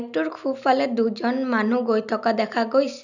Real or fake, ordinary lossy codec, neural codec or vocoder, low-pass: real; none; none; 7.2 kHz